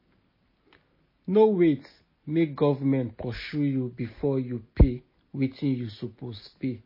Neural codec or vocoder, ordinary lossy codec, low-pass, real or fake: none; MP3, 24 kbps; 5.4 kHz; real